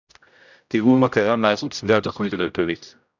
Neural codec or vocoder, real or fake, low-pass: codec, 16 kHz, 0.5 kbps, X-Codec, HuBERT features, trained on general audio; fake; 7.2 kHz